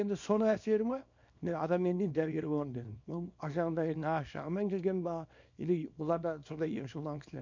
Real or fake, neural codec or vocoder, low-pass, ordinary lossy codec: fake; codec, 24 kHz, 0.9 kbps, WavTokenizer, small release; 7.2 kHz; MP3, 48 kbps